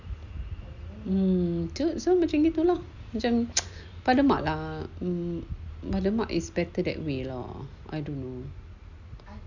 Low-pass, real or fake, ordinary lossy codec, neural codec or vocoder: 7.2 kHz; real; Opus, 64 kbps; none